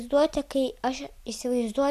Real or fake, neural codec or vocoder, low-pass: real; none; 14.4 kHz